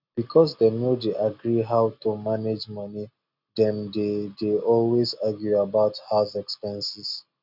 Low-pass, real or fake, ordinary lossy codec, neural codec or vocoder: 5.4 kHz; real; none; none